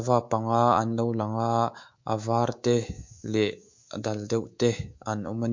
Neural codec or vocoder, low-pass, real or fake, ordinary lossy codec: codec, 24 kHz, 3.1 kbps, DualCodec; 7.2 kHz; fake; MP3, 48 kbps